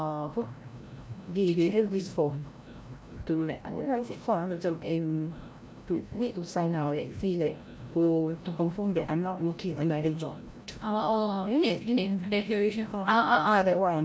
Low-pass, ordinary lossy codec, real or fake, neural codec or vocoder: none; none; fake; codec, 16 kHz, 0.5 kbps, FreqCodec, larger model